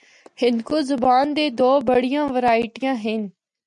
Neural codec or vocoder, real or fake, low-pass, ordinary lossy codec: none; real; 10.8 kHz; MP3, 96 kbps